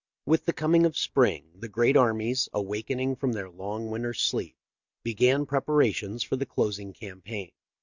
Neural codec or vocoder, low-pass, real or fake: none; 7.2 kHz; real